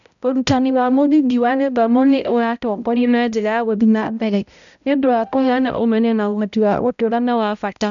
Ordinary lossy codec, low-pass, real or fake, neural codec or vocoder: none; 7.2 kHz; fake; codec, 16 kHz, 0.5 kbps, X-Codec, HuBERT features, trained on balanced general audio